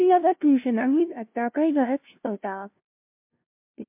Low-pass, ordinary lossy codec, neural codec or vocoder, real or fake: 3.6 kHz; MP3, 32 kbps; codec, 16 kHz, 0.5 kbps, FunCodec, trained on LibriTTS, 25 frames a second; fake